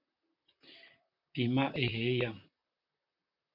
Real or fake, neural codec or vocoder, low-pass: fake; vocoder, 44.1 kHz, 128 mel bands, Pupu-Vocoder; 5.4 kHz